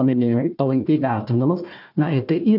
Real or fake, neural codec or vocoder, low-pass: fake; codec, 16 kHz, 1 kbps, FunCodec, trained on Chinese and English, 50 frames a second; 5.4 kHz